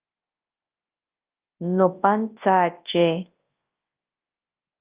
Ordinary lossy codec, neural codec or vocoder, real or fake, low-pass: Opus, 24 kbps; codec, 24 kHz, 0.9 kbps, WavTokenizer, large speech release; fake; 3.6 kHz